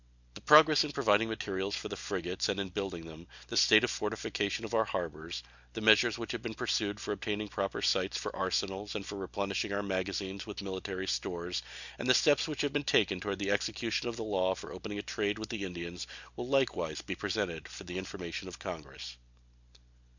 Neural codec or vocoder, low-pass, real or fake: none; 7.2 kHz; real